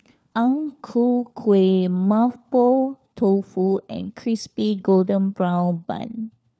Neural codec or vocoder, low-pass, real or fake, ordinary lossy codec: codec, 16 kHz, 4 kbps, FunCodec, trained on LibriTTS, 50 frames a second; none; fake; none